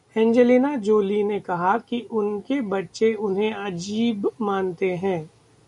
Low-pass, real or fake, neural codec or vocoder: 10.8 kHz; real; none